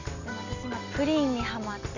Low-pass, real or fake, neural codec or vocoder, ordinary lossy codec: 7.2 kHz; real; none; none